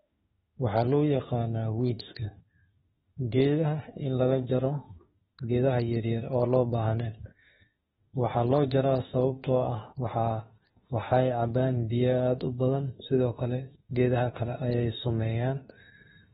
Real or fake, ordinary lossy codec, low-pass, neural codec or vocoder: fake; AAC, 16 kbps; 19.8 kHz; autoencoder, 48 kHz, 32 numbers a frame, DAC-VAE, trained on Japanese speech